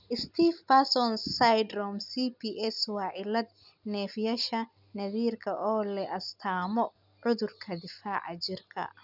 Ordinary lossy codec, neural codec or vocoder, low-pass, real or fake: none; none; 5.4 kHz; real